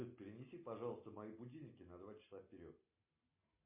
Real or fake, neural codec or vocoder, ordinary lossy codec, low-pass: real; none; MP3, 24 kbps; 3.6 kHz